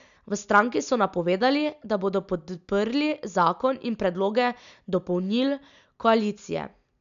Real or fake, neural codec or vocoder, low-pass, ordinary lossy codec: real; none; 7.2 kHz; none